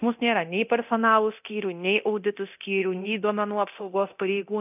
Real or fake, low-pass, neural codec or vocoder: fake; 3.6 kHz; codec, 24 kHz, 0.9 kbps, DualCodec